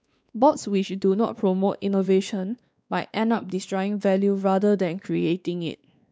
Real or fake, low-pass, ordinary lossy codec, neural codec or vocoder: fake; none; none; codec, 16 kHz, 2 kbps, X-Codec, WavLM features, trained on Multilingual LibriSpeech